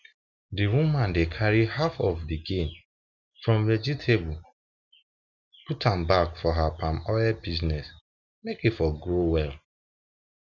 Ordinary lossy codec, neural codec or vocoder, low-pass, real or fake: none; none; 7.2 kHz; real